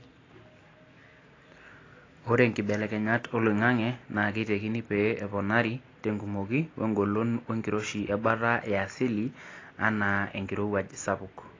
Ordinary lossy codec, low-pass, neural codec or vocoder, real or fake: AAC, 32 kbps; 7.2 kHz; none; real